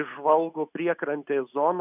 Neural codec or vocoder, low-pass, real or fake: none; 3.6 kHz; real